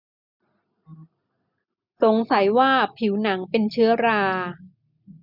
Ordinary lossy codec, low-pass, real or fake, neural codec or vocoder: none; 5.4 kHz; real; none